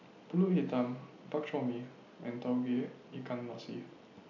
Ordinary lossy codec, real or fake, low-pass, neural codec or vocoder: none; real; 7.2 kHz; none